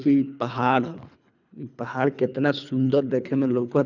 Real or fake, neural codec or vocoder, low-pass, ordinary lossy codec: fake; codec, 24 kHz, 3 kbps, HILCodec; 7.2 kHz; none